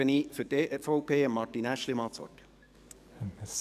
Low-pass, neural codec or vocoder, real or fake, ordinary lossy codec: 14.4 kHz; codec, 44.1 kHz, 7.8 kbps, DAC; fake; none